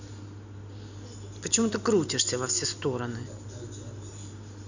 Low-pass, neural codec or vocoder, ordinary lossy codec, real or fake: 7.2 kHz; none; none; real